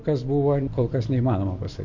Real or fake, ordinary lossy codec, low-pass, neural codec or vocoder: real; MP3, 48 kbps; 7.2 kHz; none